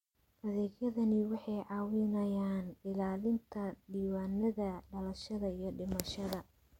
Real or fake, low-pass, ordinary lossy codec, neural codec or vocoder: real; 19.8 kHz; MP3, 64 kbps; none